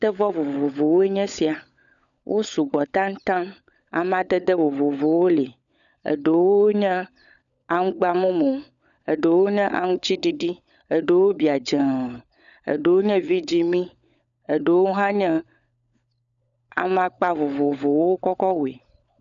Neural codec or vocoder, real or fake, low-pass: codec, 16 kHz, 16 kbps, FunCodec, trained on LibriTTS, 50 frames a second; fake; 7.2 kHz